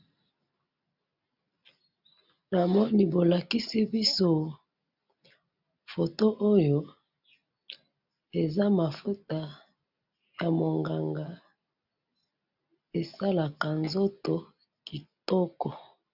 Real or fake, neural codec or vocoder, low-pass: real; none; 5.4 kHz